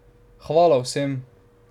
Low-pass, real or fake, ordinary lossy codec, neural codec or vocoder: 19.8 kHz; real; none; none